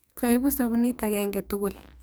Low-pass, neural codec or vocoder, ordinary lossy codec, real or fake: none; codec, 44.1 kHz, 2.6 kbps, SNAC; none; fake